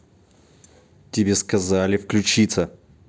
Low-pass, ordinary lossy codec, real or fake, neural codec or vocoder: none; none; real; none